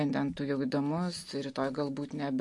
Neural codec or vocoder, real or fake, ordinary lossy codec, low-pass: none; real; MP3, 48 kbps; 10.8 kHz